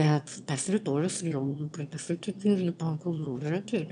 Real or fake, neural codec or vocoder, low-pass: fake; autoencoder, 22.05 kHz, a latent of 192 numbers a frame, VITS, trained on one speaker; 9.9 kHz